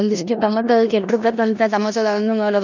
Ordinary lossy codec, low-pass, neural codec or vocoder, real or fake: none; 7.2 kHz; codec, 16 kHz in and 24 kHz out, 0.9 kbps, LongCat-Audio-Codec, four codebook decoder; fake